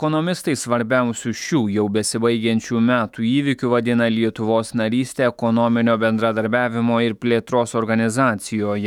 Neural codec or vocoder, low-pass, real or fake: autoencoder, 48 kHz, 128 numbers a frame, DAC-VAE, trained on Japanese speech; 19.8 kHz; fake